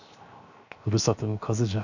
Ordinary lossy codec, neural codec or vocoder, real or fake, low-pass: none; codec, 16 kHz, 0.7 kbps, FocalCodec; fake; 7.2 kHz